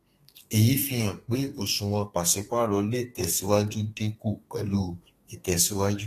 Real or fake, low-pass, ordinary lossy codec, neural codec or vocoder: fake; 14.4 kHz; AAC, 48 kbps; codec, 32 kHz, 1.9 kbps, SNAC